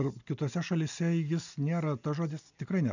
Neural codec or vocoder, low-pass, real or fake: none; 7.2 kHz; real